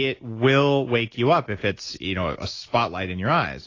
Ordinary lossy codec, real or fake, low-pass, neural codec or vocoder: AAC, 32 kbps; real; 7.2 kHz; none